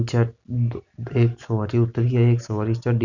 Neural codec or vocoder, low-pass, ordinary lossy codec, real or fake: none; 7.2 kHz; none; real